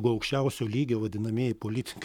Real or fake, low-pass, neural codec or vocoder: fake; 19.8 kHz; codec, 44.1 kHz, 7.8 kbps, Pupu-Codec